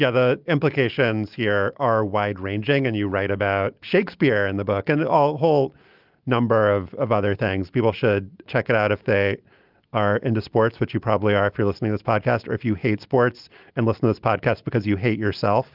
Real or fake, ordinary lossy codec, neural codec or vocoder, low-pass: real; Opus, 24 kbps; none; 5.4 kHz